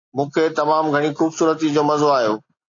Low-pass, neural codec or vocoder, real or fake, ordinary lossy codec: 7.2 kHz; none; real; AAC, 48 kbps